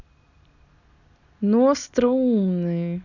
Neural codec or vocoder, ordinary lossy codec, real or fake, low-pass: none; none; real; 7.2 kHz